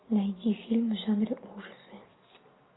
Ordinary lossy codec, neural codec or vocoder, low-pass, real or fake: AAC, 16 kbps; none; 7.2 kHz; real